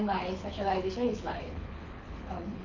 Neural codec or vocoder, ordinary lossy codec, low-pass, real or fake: codec, 24 kHz, 6 kbps, HILCodec; none; 7.2 kHz; fake